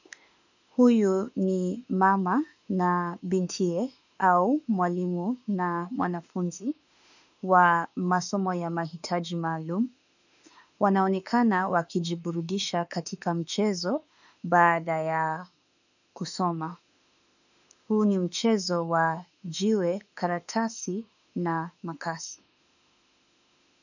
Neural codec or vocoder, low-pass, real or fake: autoencoder, 48 kHz, 32 numbers a frame, DAC-VAE, trained on Japanese speech; 7.2 kHz; fake